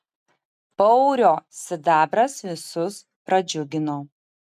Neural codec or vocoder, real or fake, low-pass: none; real; 14.4 kHz